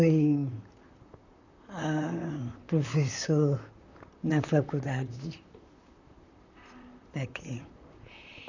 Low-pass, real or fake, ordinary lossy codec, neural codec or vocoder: 7.2 kHz; fake; none; vocoder, 44.1 kHz, 128 mel bands, Pupu-Vocoder